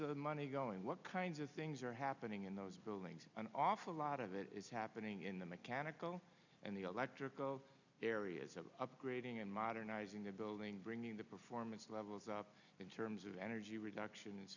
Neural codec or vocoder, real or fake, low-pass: none; real; 7.2 kHz